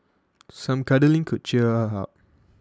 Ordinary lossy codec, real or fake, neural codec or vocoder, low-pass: none; real; none; none